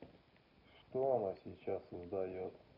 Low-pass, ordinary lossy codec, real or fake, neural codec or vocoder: 5.4 kHz; none; real; none